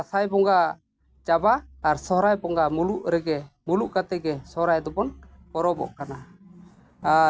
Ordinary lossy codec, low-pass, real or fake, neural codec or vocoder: none; none; real; none